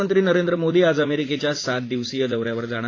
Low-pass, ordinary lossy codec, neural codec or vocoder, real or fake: 7.2 kHz; AAC, 32 kbps; vocoder, 44.1 kHz, 80 mel bands, Vocos; fake